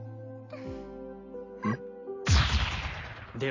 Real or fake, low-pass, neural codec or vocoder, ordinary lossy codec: real; 7.2 kHz; none; AAC, 32 kbps